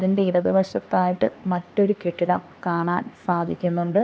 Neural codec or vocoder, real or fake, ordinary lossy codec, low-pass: codec, 16 kHz, 2 kbps, X-Codec, HuBERT features, trained on LibriSpeech; fake; none; none